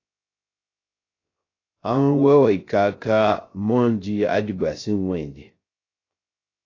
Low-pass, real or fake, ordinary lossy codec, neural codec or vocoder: 7.2 kHz; fake; AAC, 32 kbps; codec, 16 kHz, 0.3 kbps, FocalCodec